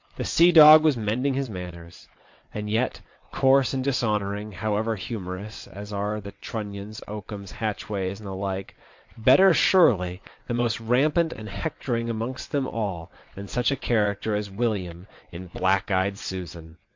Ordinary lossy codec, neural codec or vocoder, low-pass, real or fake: MP3, 48 kbps; vocoder, 22.05 kHz, 80 mel bands, WaveNeXt; 7.2 kHz; fake